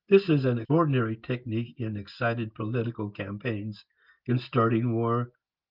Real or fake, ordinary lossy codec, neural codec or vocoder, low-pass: real; Opus, 24 kbps; none; 5.4 kHz